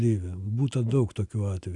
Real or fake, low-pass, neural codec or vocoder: real; 10.8 kHz; none